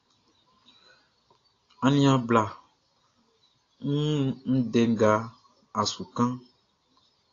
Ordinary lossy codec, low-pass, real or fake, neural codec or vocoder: AAC, 32 kbps; 7.2 kHz; real; none